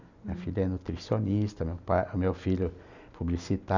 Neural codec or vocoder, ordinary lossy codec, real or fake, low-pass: none; none; real; 7.2 kHz